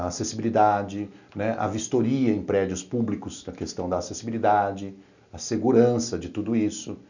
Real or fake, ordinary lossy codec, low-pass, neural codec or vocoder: real; none; 7.2 kHz; none